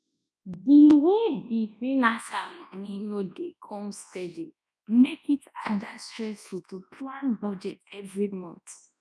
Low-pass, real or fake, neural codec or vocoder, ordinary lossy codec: none; fake; codec, 24 kHz, 0.9 kbps, WavTokenizer, large speech release; none